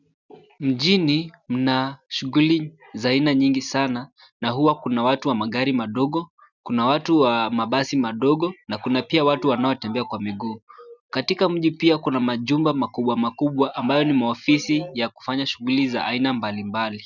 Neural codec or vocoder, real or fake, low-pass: none; real; 7.2 kHz